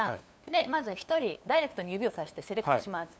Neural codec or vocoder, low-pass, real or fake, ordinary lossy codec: codec, 16 kHz, 2 kbps, FunCodec, trained on LibriTTS, 25 frames a second; none; fake; none